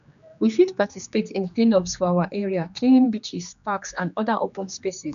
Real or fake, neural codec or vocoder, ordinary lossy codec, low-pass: fake; codec, 16 kHz, 2 kbps, X-Codec, HuBERT features, trained on general audio; none; 7.2 kHz